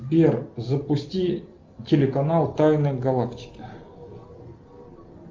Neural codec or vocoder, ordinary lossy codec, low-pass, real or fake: none; Opus, 24 kbps; 7.2 kHz; real